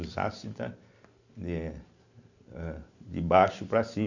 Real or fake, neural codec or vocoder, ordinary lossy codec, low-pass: real; none; none; 7.2 kHz